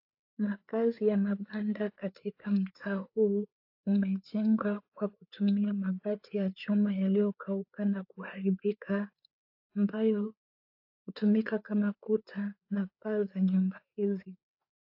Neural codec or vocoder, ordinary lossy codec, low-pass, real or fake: codec, 16 kHz, 4 kbps, FreqCodec, larger model; AAC, 48 kbps; 5.4 kHz; fake